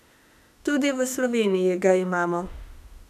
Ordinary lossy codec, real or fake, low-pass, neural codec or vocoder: none; fake; 14.4 kHz; autoencoder, 48 kHz, 32 numbers a frame, DAC-VAE, trained on Japanese speech